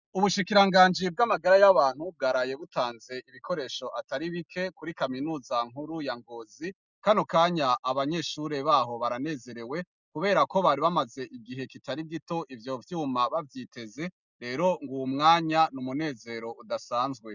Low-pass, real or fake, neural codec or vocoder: 7.2 kHz; real; none